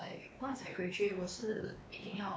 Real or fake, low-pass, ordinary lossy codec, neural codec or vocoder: fake; none; none; codec, 16 kHz, 2 kbps, X-Codec, WavLM features, trained on Multilingual LibriSpeech